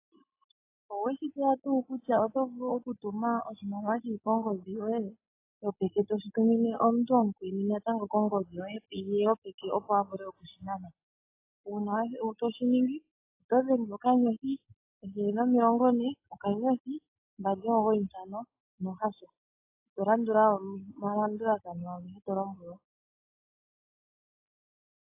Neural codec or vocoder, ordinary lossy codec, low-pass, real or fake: none; AAC, 24 kbps; 3.6 kHz; real